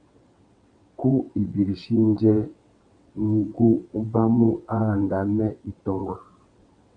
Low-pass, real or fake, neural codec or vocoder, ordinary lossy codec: 9.9 kHz; fake; vocoder, 22.05 kHz, 80 mel bands, WaveNeXt; AAC, 48 kbps